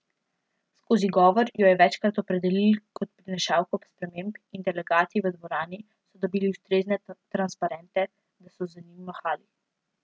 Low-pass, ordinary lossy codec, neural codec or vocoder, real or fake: none; none; none; real